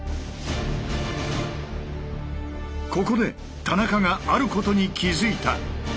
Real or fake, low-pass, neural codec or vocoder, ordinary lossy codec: real; none; none; none